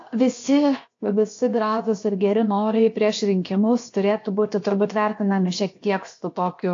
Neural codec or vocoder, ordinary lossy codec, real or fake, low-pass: codec, 16 kHz, 0.7 kbps, FocalCodec; AAC, 48 kbps; fake; 7.2 kHz